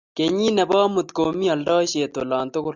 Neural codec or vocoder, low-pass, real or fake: none; 7.2 kHz; real